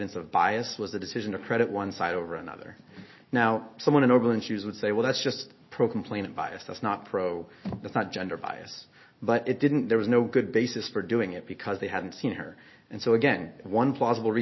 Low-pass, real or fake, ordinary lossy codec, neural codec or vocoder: 7.2 kHz; real; MP3, 24 kbps; none